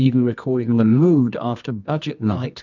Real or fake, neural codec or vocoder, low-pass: fake; codec, 24 kHz, 0.9 kbps, WavTokenizer, medium music audio release; 7.2 kHz